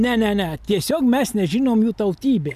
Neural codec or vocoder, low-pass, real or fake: none; 14.4 kHz; real